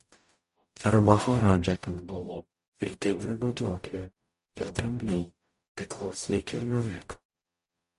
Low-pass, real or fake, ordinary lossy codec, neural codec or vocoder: 14.4 kHz; fake; MP3, 48 kbps; codec, 44.1 kHz, 0.9 kbps, DAC